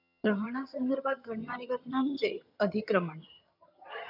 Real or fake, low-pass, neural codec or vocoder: fake; 5.4 kHz; vocoder, 22.05 kHz, 80 mel bands, HiFi-GAN